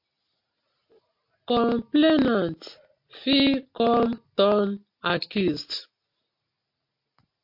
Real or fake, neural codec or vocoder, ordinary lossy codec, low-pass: real; none; MP3, 32 kbps; 5.4 kHz